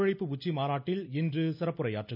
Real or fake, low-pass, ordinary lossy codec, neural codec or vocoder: real; 5.4 kHz; none; none